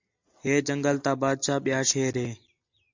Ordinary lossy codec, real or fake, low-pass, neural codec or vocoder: AAC, 48 kbps; real; 7.2 kHz; none